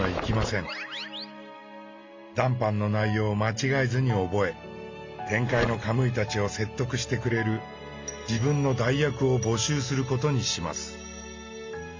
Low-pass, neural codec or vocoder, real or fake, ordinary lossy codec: 7.2 kHz; none; real; MP3, 64 kbps